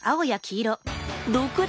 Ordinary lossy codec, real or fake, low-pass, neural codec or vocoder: none; real; none; none